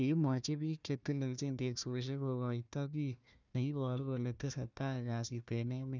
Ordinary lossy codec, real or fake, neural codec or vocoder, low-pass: none; fake; codec, 16 kHz, 1 kbps, FunCodec, trained on Chinese and English, 50 frames a second; 7.2 kHz